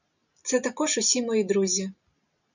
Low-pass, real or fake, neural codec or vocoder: 7.2 kHz; real; none